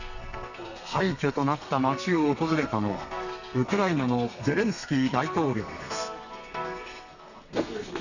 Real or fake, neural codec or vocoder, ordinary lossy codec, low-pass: fake; codec, 32 kHz, 1.9 kbps, SNAC; none; 7.2 kHz